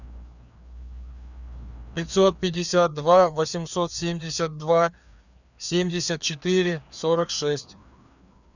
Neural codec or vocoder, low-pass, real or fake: codec, 16 kHz, 2 kbps, FreqCodec, larger model; 7.2 kHz; fake